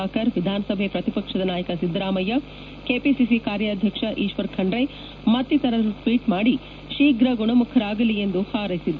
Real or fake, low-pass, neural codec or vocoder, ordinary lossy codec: real; 7.2 kHz; none; none